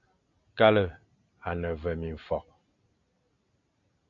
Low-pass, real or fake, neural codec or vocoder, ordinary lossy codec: 7.2 kHz; real; none; AAC, 64 kbps